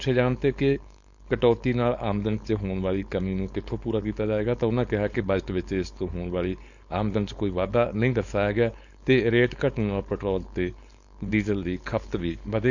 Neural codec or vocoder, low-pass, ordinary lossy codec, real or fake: codec, 16 kHz, 4.8 kbps, FACodec; 7.2 kHz; none; fake